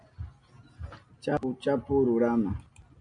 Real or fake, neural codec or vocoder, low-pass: real; none; 9.9 kHz